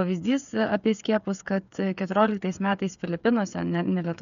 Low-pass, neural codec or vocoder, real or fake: 7.2 kHz; codec, 16 kHz, 8 kbps, FreqCodec, smaller model; fake